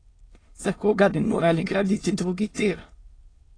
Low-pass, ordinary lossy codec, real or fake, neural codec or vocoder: 9.9 kHz; AAC, 32 kbps; fake; autoencoder, 22.05 kHz, a latent of 192 numbers a frame, VITS, trained on many speakers